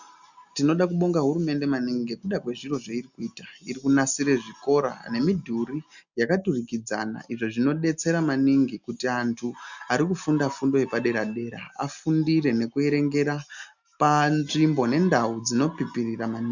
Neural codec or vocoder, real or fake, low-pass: none; real; 7.2 kHz